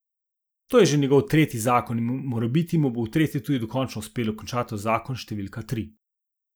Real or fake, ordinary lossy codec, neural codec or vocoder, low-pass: real; none; none; none